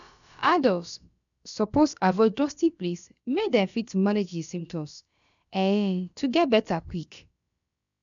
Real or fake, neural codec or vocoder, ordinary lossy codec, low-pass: fake; codec, 16 kHz, about 1 kbps, DyCAST, with the encoder's durations; none; 7.2 kHz